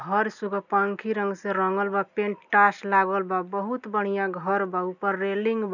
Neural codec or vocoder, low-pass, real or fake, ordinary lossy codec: none; 7.2 kHz; real; none